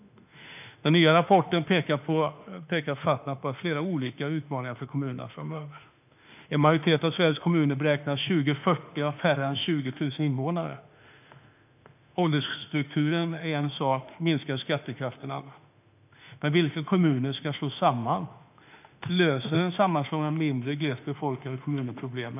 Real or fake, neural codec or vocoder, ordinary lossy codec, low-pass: fake; autoencoder, 48 kHz, 32 numbers a frame, DAC-VAE, trained on Japanese speech; none; 3.6 kHz